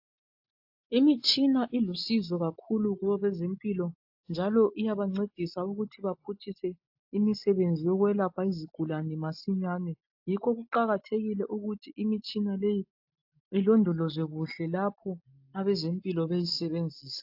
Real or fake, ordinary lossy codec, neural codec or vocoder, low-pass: real; AAC, 48 kbps; none; 5.4 kHz